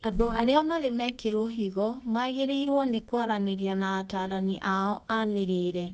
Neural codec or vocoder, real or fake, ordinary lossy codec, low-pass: codec, 24 kHz, 0.9 kbps, WavTokenizer, medium music audio release; fake; none; 10.8 kHz